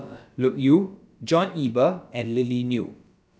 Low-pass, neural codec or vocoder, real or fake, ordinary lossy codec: none; codec, 16 kHz, about 1 kbps, DyCAST, with the encoder's durations; fake; none